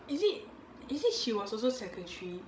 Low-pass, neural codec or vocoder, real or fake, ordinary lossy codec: none; codec, 16 kHz, 16 kbps, FreqCodec, larger model; fake; none